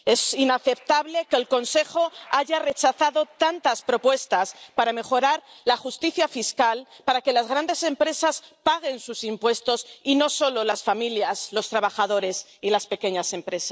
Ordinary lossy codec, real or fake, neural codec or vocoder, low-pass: none; real; none; none